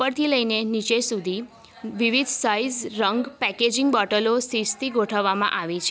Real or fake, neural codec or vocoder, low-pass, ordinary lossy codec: real; none; none; none